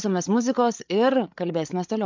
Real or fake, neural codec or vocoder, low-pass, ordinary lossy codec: fake; codec, 16 kHz, 8 kbps, FreqCodec, larger model; 7.2 kHz; MP3, 64 kbps